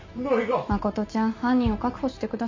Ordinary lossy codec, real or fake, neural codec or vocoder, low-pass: MP3, 64 kbps; real; none; 7.2 kHz